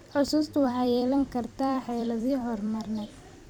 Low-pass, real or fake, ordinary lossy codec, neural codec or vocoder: 19.8 kHz; fake; none; vocoder, 44.1 kHz, 128 mel bands every 256 samples, BigVGAN v2